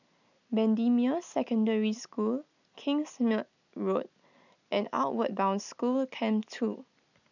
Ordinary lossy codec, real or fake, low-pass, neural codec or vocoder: none; real; 7.2 kHz; none